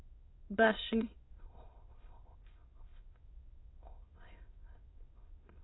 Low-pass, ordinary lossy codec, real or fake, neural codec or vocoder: 7.2 kHz; AAC, 16 kbps; fake; autoencoder, 22.05 kHz, a latent of 192 numbers a frame, VITS, trained on many speakers